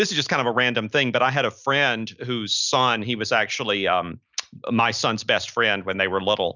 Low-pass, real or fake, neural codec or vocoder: 7.2 kHz; real; none